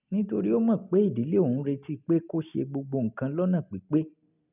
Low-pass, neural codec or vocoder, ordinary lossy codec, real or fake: 3.6 kHz; none; none; real